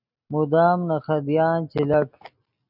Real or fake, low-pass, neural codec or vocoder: real; 5.4 kHz; none